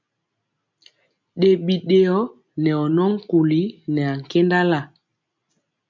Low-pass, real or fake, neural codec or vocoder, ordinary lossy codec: 7.2 kHz; real; none; MP3, 64 kbps